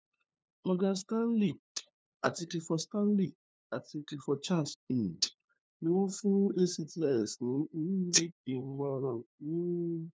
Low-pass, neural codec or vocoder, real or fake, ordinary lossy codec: none; codec, 16 kHz, 2 kbps, FunCodec, trained on LibriTTS, 25 frames a second; fake; none